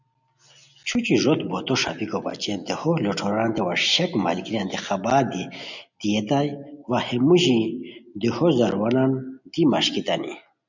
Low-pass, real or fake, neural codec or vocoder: 7.2 kHz; real; none